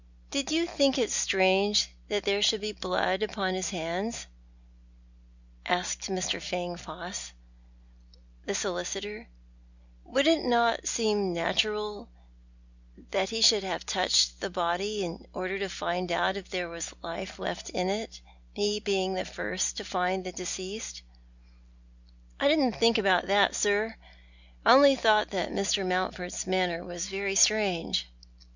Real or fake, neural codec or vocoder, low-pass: real; none; 7.2 kHz